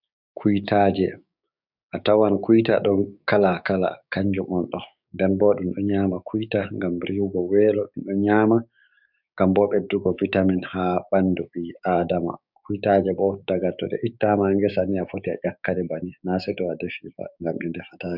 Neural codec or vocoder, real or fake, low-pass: codec, 16 kHz, 6 kbps, DAC; fake; 5.4 kHz